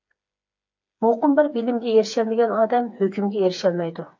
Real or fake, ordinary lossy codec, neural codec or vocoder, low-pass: fake; AAC, 48 kbps; codec, 16 kHz, 4 kbps, FreqCodec, smaller model; 7.2 kHz